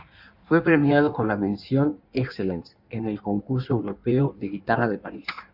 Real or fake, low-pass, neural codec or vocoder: fake; 5.4 kHz; codec, 16 kHz in and 24 kHz out, 1.1 kbps, FireRedTTS-2 codec